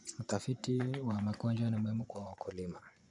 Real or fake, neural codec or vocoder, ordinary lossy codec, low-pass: fake; vocoder, 24 kHz, 100 mel bands, Vocos; none; 10.8 kHz